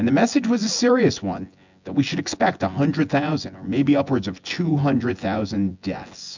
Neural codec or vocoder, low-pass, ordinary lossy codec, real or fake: vocoder, 24 kHz, 100 mel bands, Vocos; 7.2 kHz; MP3, 64 kbps; fake